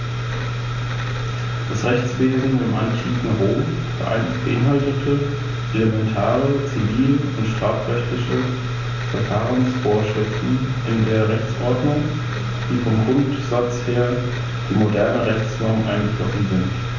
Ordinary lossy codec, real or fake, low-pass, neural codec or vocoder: none; real; 7.2 kHz; none